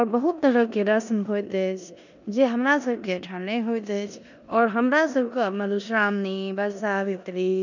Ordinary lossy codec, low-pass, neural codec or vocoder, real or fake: none; 7.2 kHz; codec, 16 kHz in and 24 kHz out, 0.9 kbps, LongCat-Audio-Codec, four codebook decoder; fake